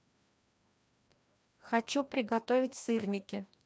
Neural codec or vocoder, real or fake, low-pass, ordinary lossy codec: codec, 16 kHz, 1 kbps, FreqCodec, larger model; fake; none; none